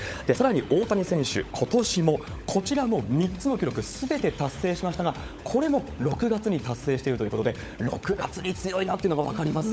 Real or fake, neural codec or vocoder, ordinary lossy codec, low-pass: fake; codec, 16 kHz, 16 kbps, FunCodec, trained on LibriTTS, 50 frames a second; none; none